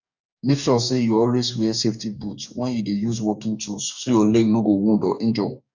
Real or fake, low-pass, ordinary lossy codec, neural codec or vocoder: fake; 7.2 kHz; none; codec, 44.1 kHz, 2.6 kbps, DAC